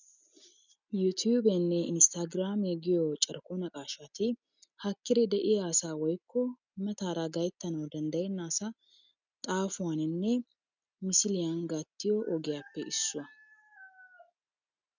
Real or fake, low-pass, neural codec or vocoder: real; 7.2 kHz; none